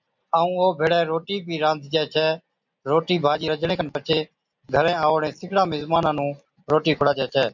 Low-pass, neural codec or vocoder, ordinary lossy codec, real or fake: 7.2 kHz; none; MP3, 64 kbps; real